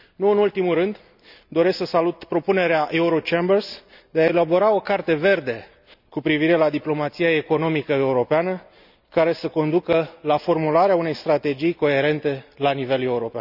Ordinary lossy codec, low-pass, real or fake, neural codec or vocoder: none; 5.4 kHz; real; none